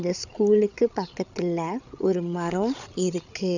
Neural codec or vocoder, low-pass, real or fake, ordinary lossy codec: codec, 16 kHz, 8 kbps, FreqCodec, larger model; 7.2 kHz; fake; none